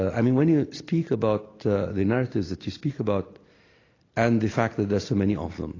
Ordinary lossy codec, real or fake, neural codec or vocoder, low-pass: AAC, 32 kbps; real; none; 7.2 kHz